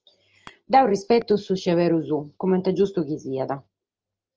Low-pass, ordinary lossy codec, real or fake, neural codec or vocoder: 7.2 kHz; Opus, 24 kbps; real; none